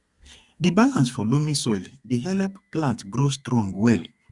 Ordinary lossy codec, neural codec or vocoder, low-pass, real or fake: Opus, 64 kbps; codec, 32 kHz, 1.9 kbps, SNAC; 10.8 kHz; fake